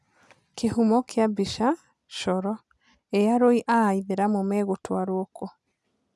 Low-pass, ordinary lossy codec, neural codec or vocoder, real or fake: none; none; none; real